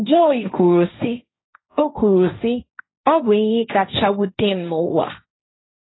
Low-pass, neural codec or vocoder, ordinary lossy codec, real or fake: 7.2 kHz; codec, 16 kHz, 1.1 kbps, Voila-Tokenizer; AAC, 16 kbps; fake